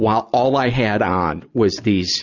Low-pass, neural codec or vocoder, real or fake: 7.2 kHz; none; real